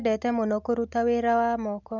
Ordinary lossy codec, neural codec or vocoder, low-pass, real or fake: none; none; 7.2 kHz; real